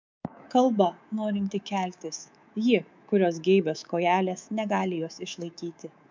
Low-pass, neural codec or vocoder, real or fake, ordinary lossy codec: 7.2 kHz; codec, 24 kHz, 3.1 kbps, DualCodec; fake; MP3, 64 kbps